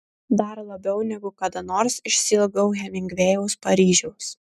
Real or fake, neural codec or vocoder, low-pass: real; none; 14.4 kHz